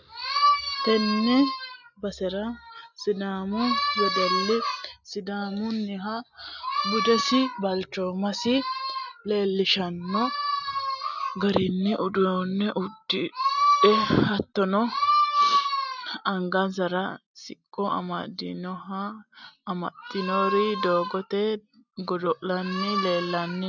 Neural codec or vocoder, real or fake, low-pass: none; real; 7.2 kHz